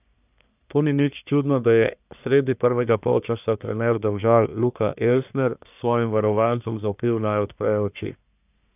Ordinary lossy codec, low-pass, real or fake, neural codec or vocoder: none; 3.6 kHz; fake; codec, 44.1 kHz, 1.7 kbps, Pupu-Codec